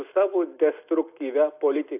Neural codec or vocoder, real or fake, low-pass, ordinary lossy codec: none; real; 3.6 kHz; AAC, 32 kbps